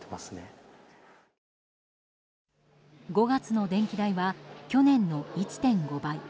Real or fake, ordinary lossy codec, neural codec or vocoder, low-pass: real; none; none; none